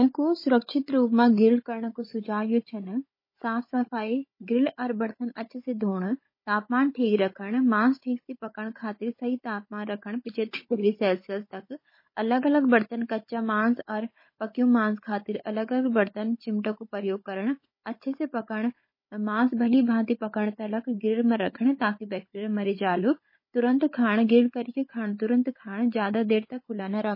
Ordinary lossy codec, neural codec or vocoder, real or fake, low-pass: MP3, 24 kbps; codec, 16 kHz, 16 kbps, FunCodec, trained on Chinese and English, 50 frames a second; fake; 5.4 kHz